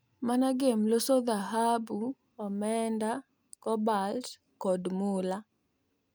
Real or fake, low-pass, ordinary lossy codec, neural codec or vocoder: real; none; none; none